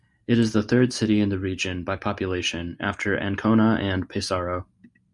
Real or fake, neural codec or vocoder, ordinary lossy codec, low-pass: real; none; MP3, 96 kbps; 10.8 kHz